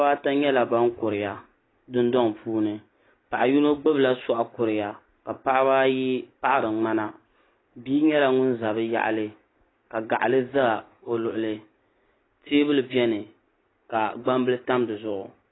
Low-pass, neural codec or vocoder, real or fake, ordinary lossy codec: 7.2 kHz; none; real; AAC, 16 kbps